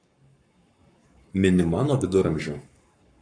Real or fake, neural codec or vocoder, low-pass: fake; codec, 44.1 kHz, 7.8 kbps, Pupu-Codec; 9.9 kHz